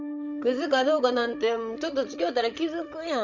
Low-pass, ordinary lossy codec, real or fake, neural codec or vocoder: 7.2 kHz; none; fake; codec, 16 kHz, 16 kbps, FreqCodec, larger model